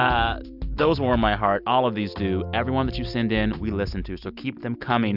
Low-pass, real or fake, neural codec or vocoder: 5.4 kHz; real; none